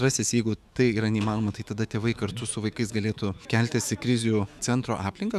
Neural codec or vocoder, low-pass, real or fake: autoencoder, 48 kHz, 128 numbers a frame, DAC-VAE, trained on Japanese speech; 14.4 kHz; fake